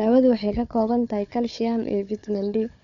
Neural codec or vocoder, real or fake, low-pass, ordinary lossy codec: codec, 16 kHz, 4 kbps, FunCodec, trained on Chinese and English, 50 frames a second; fake; 7.2 kHz; none